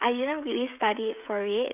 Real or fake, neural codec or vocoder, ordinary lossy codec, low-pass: fake; codec, 16 kHz, 16 kbps, FreqCodec, smaller model; none; 3.6 kHz